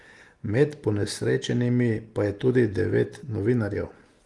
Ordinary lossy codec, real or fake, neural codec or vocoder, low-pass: Opus, 24 kbps; real; none; 10.8 kHz